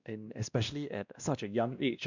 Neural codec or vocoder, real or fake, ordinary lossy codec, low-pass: codec, 16 kHz, 1 kbps, X-Codec, WavLM features, trained on Multilingual LibriSpeech; fake; none; 7.2 kHz